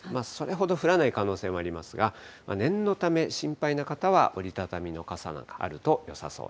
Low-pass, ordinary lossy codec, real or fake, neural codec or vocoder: none; none; real; none